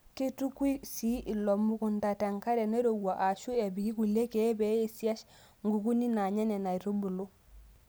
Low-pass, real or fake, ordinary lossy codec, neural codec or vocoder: none; real; none; none